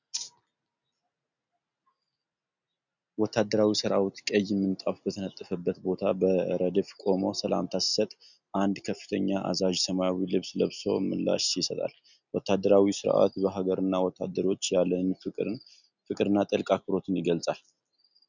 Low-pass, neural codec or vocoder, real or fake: 7.2 kHz; none; real